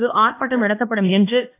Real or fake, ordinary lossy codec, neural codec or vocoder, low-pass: fake; AAC, 24 kbps; codec, 16 kHz, 2 kbps, X-Codec, HuBERT features, trained on LibriSpeech; 3.6 kHz